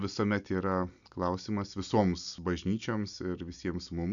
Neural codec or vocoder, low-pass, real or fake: none; 7.2 kHz; real